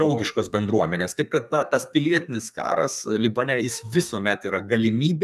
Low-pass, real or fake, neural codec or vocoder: 14.4 kHz; fake; codec, 32 kHz, 1.9 kbps, SNAC